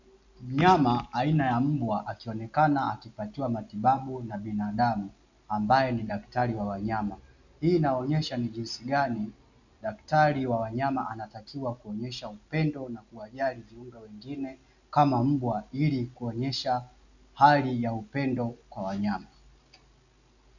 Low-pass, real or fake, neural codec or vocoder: 7.2 kHz; real; none